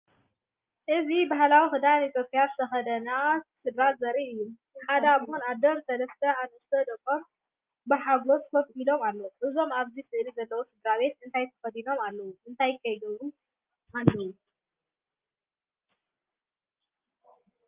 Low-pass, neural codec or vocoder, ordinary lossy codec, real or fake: 3.6 kHz; none; Opus, 24 kbps; real